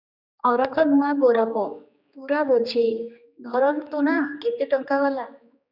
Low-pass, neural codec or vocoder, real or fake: 5.4 kHz; codec, 16 kHz, 2 kbps, X-Codec, HuBERT features, trained on general audio; fake